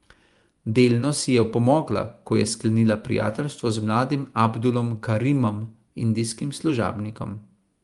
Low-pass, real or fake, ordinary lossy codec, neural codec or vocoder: 10.8 kHz; fake; Opus, 32 kbps; vocoder, 24 kHz, 100 mel bands, Vocos